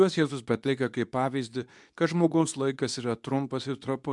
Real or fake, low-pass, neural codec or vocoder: fake; 10.8 kHz; codec, 24 kHz, 0.9 kbps, WavTokenizer, medium speech release version 2